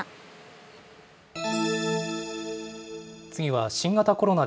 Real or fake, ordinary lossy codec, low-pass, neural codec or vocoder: real; none; none; none